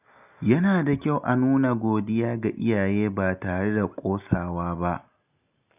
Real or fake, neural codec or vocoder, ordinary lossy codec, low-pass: real; none; none; 3.6 kHz